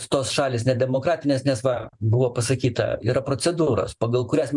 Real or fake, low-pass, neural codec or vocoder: fake; 10.8 kHz; vocoder, 44.1 kHz, 128 mel bands every 512 samples, BigVGAN v2